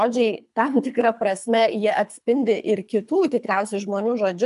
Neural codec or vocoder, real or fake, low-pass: codec, 24 kHz, 3 kbps, HILCodec; fake; 10.8 kHz